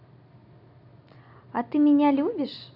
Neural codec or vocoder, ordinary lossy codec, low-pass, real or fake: none; none; 5.4 kHz; real